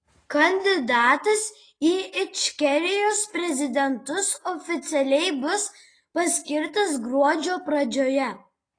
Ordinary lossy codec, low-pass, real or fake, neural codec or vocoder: AAC, 32 kbps; 9.9 kHz; real; none